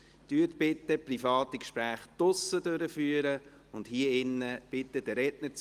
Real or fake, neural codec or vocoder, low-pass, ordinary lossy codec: real; none; 14.4 kHz; Opus, 24 kbps